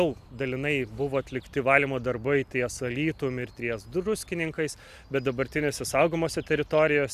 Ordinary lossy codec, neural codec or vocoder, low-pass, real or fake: AAC, 96 kbps; none; 14.4 kHz; real